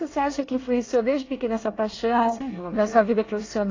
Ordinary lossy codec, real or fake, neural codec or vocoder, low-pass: AAC, 32 kbps; fake; codec, 24 kHz, 1 kbps, SNAC; 7.2 kHz